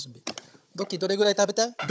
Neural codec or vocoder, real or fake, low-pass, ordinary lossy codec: codec, 16 kHz, 16 kbps, FunCodec, trained on Chinese and English, 50 frames a second; fake; none; none